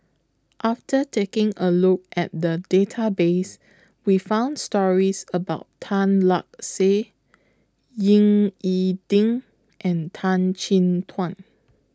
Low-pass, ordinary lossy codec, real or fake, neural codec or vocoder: none; none; real; none